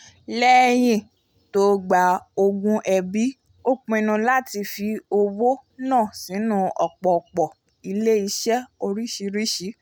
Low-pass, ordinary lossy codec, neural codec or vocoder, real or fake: none; none; none; real